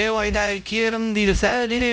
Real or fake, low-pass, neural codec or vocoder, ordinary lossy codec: fake; none; codec, 16 kHz, 0.5 kbps, X-Codec, HuBERT features, trained on LibriSpeech; none